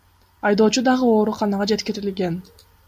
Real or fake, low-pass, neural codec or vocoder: real; 14.4 kHz; none